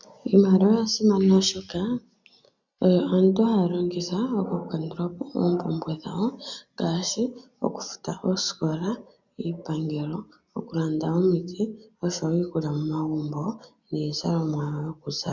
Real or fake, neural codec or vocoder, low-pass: real; none; 7.2 kHz